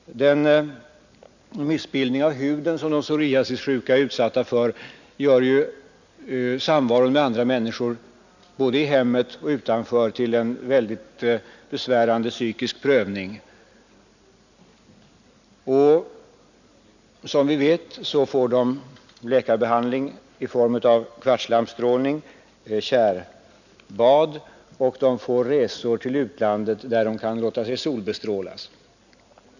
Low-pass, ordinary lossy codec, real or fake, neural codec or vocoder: 7.2 kHz; none; real; none